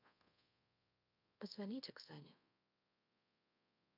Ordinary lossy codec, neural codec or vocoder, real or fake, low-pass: none; codec, 24 kHz, 0.5 kbps, DualCodec; fake; 5.4 kHz